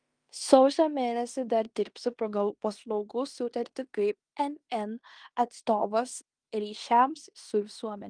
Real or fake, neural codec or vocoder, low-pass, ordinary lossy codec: fake; codec, 16 kHz in and 24 kHz out, 0.9 kbps, LongCat-Audio-Codec, fine tuned four codebook decoder; 9.9 kHz; Opus, 32 kbps